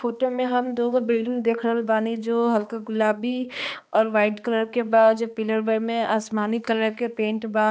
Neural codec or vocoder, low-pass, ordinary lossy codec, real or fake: codec, 16 kHz, 2 kbps, X-Codec, HuBERT features, trained on balanced general audio; none; none; fake